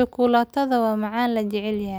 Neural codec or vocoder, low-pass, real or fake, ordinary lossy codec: none; none; real; none